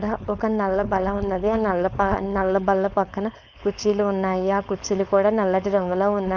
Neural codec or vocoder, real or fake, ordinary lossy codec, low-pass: codec, 16 kHz, 4.8 kbps, FACodec; fake; none; none